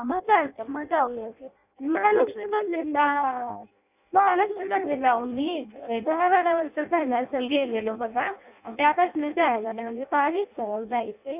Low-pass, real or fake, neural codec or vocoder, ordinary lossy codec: 3.6 kHz; fake; codec, 16 kHz in and 24 kHz out, 0.6 kbps, FireRedTTS-2 codec; none